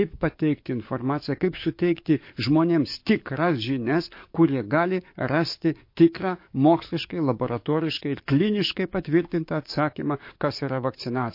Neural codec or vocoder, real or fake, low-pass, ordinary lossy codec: codec, 44.1 kHz, 7.8 kbps, DAC; fake; 5.4 kHz; MP3, 32 kbps